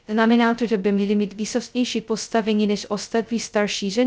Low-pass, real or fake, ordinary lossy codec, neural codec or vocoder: none; fake; none; codec, 16 kHz, 0.2 kbps, FocalCodec